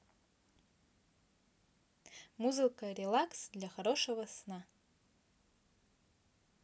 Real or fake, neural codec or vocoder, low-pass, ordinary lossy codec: real; none; none; none